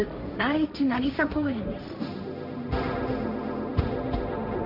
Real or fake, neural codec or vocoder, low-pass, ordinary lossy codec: fake; codec, 16 kHz, 1.1 kbps, Voila-Tokenizer; 5.4 kHz; none